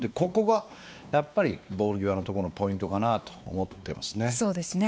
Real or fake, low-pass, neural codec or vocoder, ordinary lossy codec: fake; none; codec, 16 kHz, 2 kbps, X-Codec, WavLM features, trained on Multilingual LibriSpeech; none